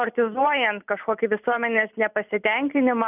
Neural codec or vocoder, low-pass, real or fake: none; 3.6 kHz; real